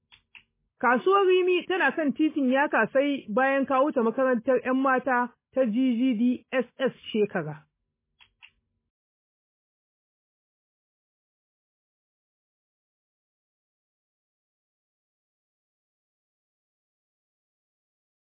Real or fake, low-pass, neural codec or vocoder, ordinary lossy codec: real; 3.6 kHz; none; MP3, 16 kbps